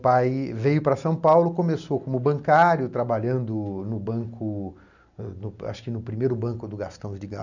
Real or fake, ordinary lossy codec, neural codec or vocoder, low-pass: real; none; none; 7.2 kHz